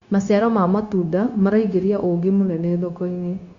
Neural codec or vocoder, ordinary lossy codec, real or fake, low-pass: codec, 16 kHz, 0.9 kbps, LongCat-Audio-Codec; Opus, 64 kbps; fake; 7.2 kHz